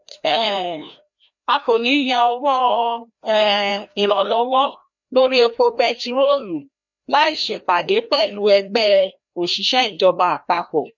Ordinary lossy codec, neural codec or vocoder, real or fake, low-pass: none; codec, 16 kHz, 1 kbps, FreqCodec, larger model; fake; 7.2 kHz